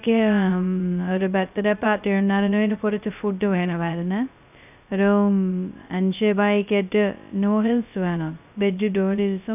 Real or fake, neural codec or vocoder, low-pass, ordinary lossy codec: fake; codec, 16 kHz, 0.2 kbps, FocalCodec; 3.6 kHz; none